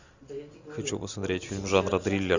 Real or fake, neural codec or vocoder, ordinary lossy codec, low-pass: real; none; Opus, 64 kbps; 7.2 kHz